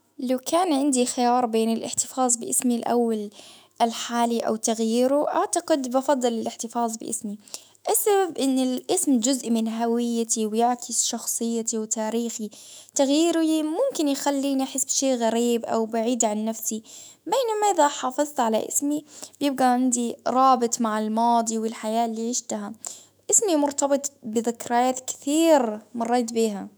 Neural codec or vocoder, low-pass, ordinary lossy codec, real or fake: autoencoder, 48 kHz, 128 numbers a frame, DAC-VAE, trained on Japanese speech; none; none; fake